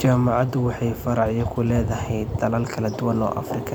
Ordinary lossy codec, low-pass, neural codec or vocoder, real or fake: none; 19.8 kHz; vocoder, 44.1 kHz, 128 mel bands every 256 samples, BigVGAN v2; fake